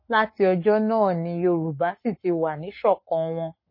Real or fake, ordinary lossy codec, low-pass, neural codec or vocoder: fake; MP3, 24 kbps; 5.4 kHz; codec, 44.1 kHz, 7.8 kbps, Pupu-Codec